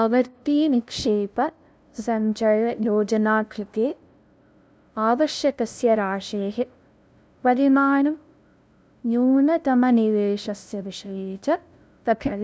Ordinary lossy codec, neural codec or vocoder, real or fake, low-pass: none; codec, 16 kHz, 0.5 kbps, FunCodec, trained on LibriTTS, 25 frames a second; fake; none